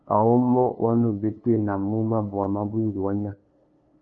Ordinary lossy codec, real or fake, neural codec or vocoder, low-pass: Opus, 32 kbps; fake; codec, 16 kHz, 2 kbps, FunCodec, trained on LibriTTS, 25 frames a second; 7.2 kHz